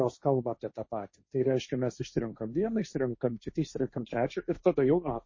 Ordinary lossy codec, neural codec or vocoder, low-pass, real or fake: MP3, 32 kbps; codec, 16 kHz, 1.1 kbps, Voila-Tokenizer; 7.2 kHz; fake